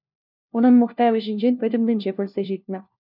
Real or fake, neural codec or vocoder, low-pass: fake; codec, 16 kHz, 1 kbps, FunCodec, trained on LibriTTS, 50 frames a second; 5.4 kHz